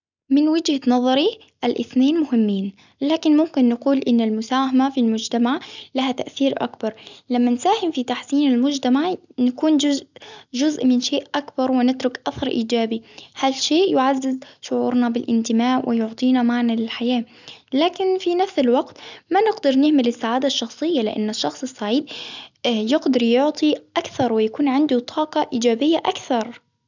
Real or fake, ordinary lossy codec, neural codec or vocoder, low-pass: real; none; none; 7.2 kHz